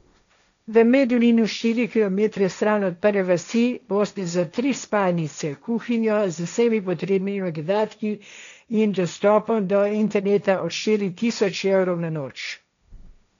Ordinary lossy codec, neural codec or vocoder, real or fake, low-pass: none; codec, 16 kHz, 1.1 kbps, Voila-Tokenizer; fake; 7.2 kHz